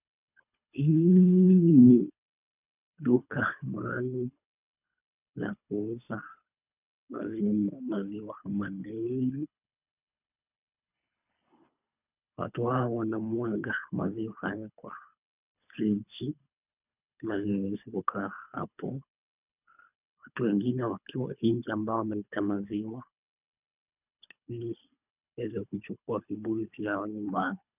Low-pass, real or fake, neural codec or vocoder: 3.6 kHz; fake; codec, 24 kHz, 3 kbps, HILCodec